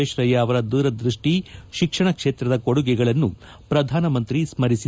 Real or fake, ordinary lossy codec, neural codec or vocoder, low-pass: real; none; none; none